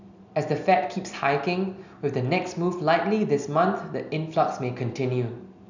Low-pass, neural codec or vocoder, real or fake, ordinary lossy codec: 7.2 kHz; none; real; none